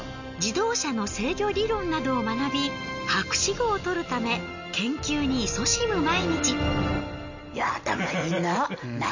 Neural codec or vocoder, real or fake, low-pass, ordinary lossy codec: none; real; 7.2 kHz; none